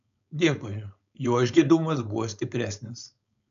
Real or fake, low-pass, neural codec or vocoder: fake; 7.2 kHz; codec, 16 kHz, 4.8 kbps, FACodec